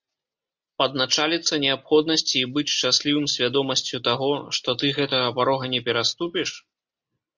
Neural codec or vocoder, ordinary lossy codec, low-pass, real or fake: none; Opus, 64 kbps; 7.2 kHz; real